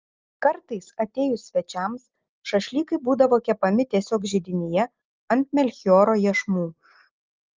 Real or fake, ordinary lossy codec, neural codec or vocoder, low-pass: real; Opus, 32 kbps; none; 7.2 kHz